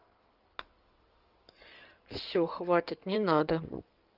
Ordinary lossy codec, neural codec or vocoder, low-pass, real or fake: Opus, 24 kbps; codec, 16 kHz in and 24 kHz out, 2.2 kbps, FireRedTTS-2 codec; 5.4 kHz; fake